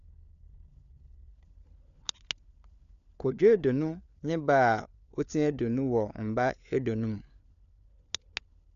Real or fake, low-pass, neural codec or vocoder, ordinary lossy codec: fake; 7.2 kHz; codec, 16 kHz, 4 kbps, FunCodec, trained on LibriTTS, 50 frames a second; none